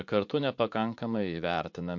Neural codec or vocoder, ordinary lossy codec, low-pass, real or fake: vocoder, 44.1 kHz, 80 mel bands, Vocos; MP3, 48 kbps; 7.2 kHz; fake